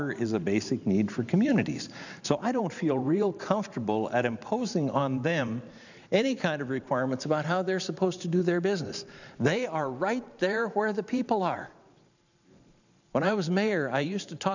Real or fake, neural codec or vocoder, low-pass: fake; vocoder, 44.1 kHz, 128 mel bands every 512 samples, BigVGAN v2; 7.2 kHz